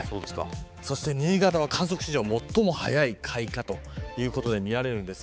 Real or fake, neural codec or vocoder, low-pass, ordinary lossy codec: fake; codec, 16 kHz, 4 kbps, X-Codec, HuBERT features, trained on balanced general audio; none; none